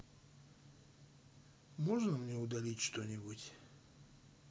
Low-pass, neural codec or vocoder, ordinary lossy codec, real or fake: none; none; none; real